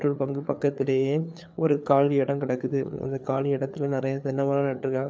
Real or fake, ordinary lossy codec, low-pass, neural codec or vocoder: fake; none; none; codec, 16 kHz, 4 kbps, FreqCodec, larger model